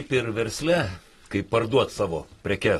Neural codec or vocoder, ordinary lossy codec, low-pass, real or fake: none; AAC, 32 kbps; 19.8 kHz; real